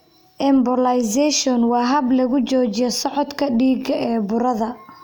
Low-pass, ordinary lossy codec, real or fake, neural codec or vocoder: 19.8 kHz; none; real; none